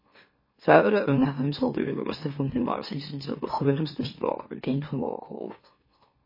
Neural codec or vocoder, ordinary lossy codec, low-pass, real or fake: autoencoder, 44.1 kHz, a latent of 192 numbers a frame, MeloTTS; MP3, 24 kbps; 5.4 kHz; fake